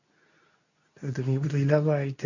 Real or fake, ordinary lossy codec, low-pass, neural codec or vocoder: fake; none; 7.2 kHz; codec, 24 kHz, 0.9 kbps, WavTokenizer, medium speech release version 2